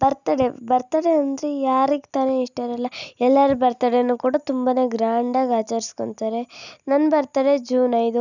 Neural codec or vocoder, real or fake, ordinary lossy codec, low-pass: none; real; none; 7.2 kHz